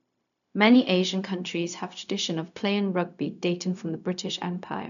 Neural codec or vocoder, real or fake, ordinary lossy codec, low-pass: codec, 16 kHz, 0.4 kbps, LongCat-Audio-Codec; fake; none; 7.2 kHz